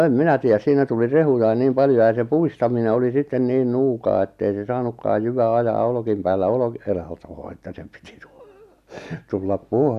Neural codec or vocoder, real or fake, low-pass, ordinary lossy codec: none; real; 14.4 kHz; none